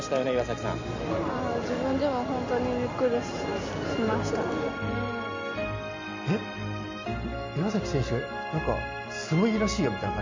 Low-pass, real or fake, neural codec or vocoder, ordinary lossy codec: 7.2 kHz; real; none; MP3, 64 kbps